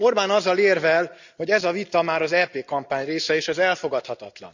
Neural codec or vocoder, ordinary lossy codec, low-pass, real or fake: none; none; 7.2 kHz; real